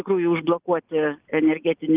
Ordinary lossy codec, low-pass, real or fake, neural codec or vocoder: Opus, 24 kbps; 3.6 kHz; real; none